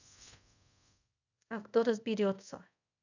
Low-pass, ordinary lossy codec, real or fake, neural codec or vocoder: 7.2 kHz; none; fake; codec, 24 kHz, 0.5 kbps, DualCodec